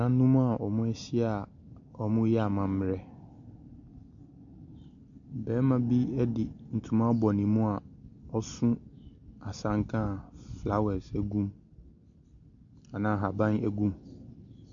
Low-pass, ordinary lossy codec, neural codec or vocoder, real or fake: 7.2 kHz; MP3, 64 kbps; none; real